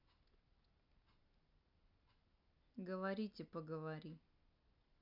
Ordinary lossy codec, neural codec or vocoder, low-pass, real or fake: MP3, 48 kbps; none; 5.4 kHz; real